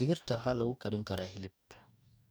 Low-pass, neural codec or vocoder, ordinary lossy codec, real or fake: none; codec, 44.1 kHz, 2.6 kbps, DAC; none; fake